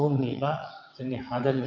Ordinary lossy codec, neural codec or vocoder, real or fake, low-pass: none; vocoder, 22.05 kHz, 80 mel bands, WaveNeXt; fake; 7.2 kHz